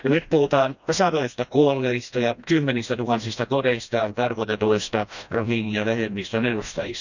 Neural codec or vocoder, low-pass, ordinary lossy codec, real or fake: codec, 16 kHz, 1 kbps, FreqCodec, smaller model; 7.2 kHz; none; fake